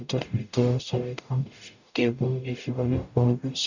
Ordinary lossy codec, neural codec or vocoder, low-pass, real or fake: none; codec, 44.1 kHz, 0.9 kbps, DAC; 7.2 kHz; fake